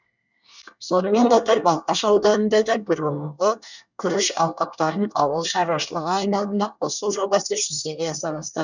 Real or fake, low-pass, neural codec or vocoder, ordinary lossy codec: fake; 7.2 kHz; codec, 24 kHz, 1 kbps, SNAC; none